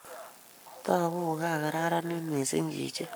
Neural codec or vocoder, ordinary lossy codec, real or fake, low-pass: codec, 44.1 kHz, 7.8 kbps, Pupu-Codec; none; fake; none